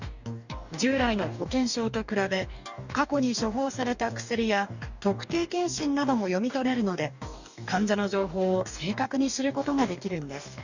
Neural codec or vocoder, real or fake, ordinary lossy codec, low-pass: codec, 44.1 kHz, 2.6 kbps, DAC; fake; none; 7.2 kHz